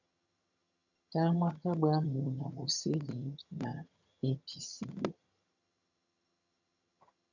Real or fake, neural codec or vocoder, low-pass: fake; vocoder, 22.05 kHz, 80 mel bands, HiFi-GAN; 7.2 kHz